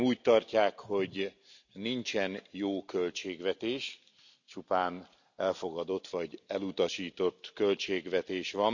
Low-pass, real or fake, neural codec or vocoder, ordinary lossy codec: 7.2 kHz; real; none; none